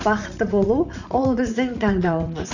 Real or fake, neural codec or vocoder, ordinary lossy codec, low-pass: fake; vocoder, 44.1 kHz, 80 mel bands, Vocos; none; 7.2 kHz